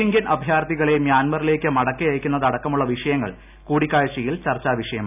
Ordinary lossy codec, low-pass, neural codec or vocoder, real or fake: none; 3.6 kHz; none; real